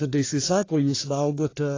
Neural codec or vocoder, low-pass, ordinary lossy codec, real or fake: codec, 44.1 kHz, 1.7 kbps, Pupu-Codec; 7.2 kHz; AAC, 32 kbps; fake